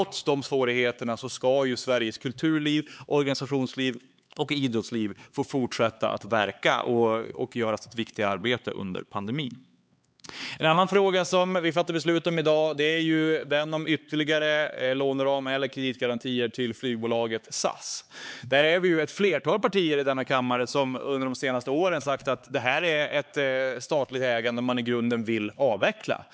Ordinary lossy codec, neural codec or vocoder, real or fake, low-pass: none; codec, 16 kHz, 4 kbps, X-Codec, HuBERT features, trained on LibriSpeech; fake; none